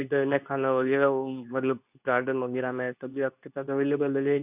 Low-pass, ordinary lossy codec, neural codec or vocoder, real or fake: 3.6 kHz; none; codec, 24 kHz, 0.9 kbps, WavTokenizer, medium speech release version 2; fake